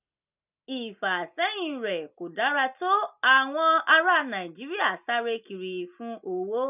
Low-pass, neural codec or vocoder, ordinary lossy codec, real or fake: 3.6 kHz; none; none; real